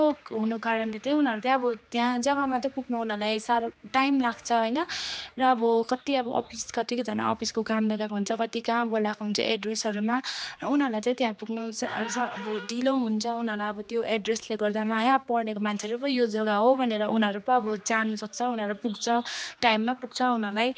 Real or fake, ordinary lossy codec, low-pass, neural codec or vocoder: fake; none; none; codec, 16 kHz, 2 kbps, X-Codec, HuBERT features, trained on general audio